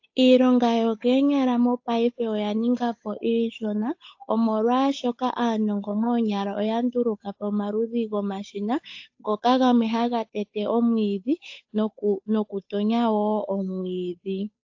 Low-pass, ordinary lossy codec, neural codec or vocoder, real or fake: 7.2 kHz; AAC, 48 kbps; codec, 16 kHz, 8 kbps, FunCodec, trained on Chinese and English, 25 frames a second; fake